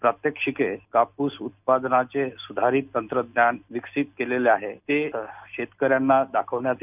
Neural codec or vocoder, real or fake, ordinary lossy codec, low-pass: none; real; none; 3.6 kHz